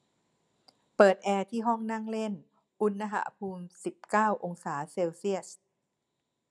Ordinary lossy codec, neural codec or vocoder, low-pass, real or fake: none; none; none; real